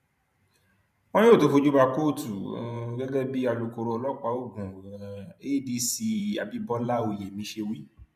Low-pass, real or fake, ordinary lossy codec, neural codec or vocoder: 14.4 kHz; fake; none; vocoder, 44.1 kHz, 128 mel bands every 512 samples, BigVGAN v2